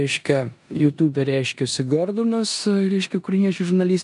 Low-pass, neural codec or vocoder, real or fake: 10.8 kHz; codec, 16 kHz in and 24 kHz out, 0.9 kbps, LongCat-Audio-Codec, four codebook decoder; fake